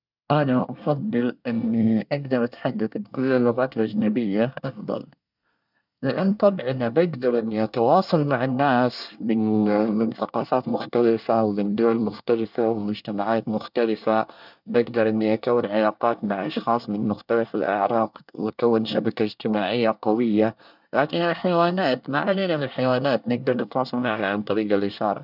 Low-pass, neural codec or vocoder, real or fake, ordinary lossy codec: 5.4 kHz; codec, 24 kHz, 1 kbps, SNAC; fake; none